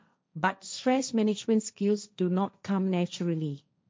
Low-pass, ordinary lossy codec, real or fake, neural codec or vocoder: none; none; fake; codec, 16 kHz, 1.1 kbps, Voila-Tokenizer